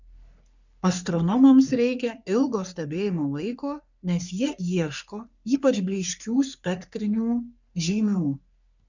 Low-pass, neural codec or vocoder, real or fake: 7.2 kHz; codec, 44.1 kHz, 3.4 kbps, Pupu-Codec; fake